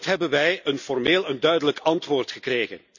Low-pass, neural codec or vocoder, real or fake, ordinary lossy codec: 7.2 kHz; none; real; none